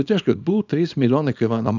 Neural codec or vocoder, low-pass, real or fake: codec, 24 kHz, 0.9 kbps, WavTokenizer, small release; 7.2 kHz; fake